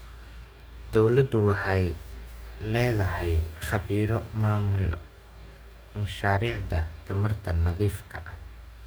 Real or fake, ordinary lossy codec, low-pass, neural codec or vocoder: fake; none; none; codec, 44.1 kHz, 2.6 kbps, DAC